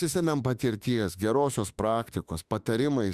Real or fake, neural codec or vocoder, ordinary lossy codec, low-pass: fake; autoencoder, 48 kHz, 32 numbers a frame, DAC-VAE, trained on Japanese speech; Opus, 64 kbps; 14.4 kHz